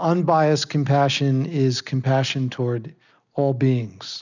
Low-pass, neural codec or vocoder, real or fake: 7.2 kHz; none; real